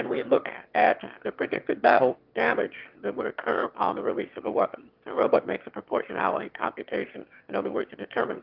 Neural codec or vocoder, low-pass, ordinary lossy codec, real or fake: autoencoder, 22.05 kHz, a latent of 192 numbers a frame, VITS, trained on one speaker; 5.4 kHz; Opus, 32 kbps; fake